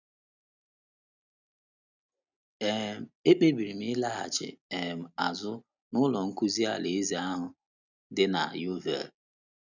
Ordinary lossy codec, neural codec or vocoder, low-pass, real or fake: none; none; 7.2 kHz; real